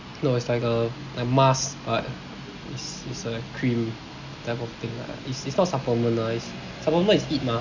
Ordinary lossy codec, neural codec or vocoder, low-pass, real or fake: none; none; 7.2 kHz; real